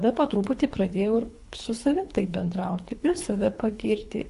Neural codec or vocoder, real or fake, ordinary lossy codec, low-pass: codec, 24 kHz, 3 kbps, HILCodec; fake; AAC, 64 kbps; 10.8 kHz